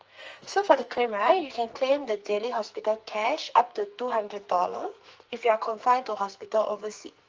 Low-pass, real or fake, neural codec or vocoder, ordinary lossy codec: 7.2 kHz; fake; codec, 44.1 kHz, 2.6 kbps, SNAC; Opus, 24 kbps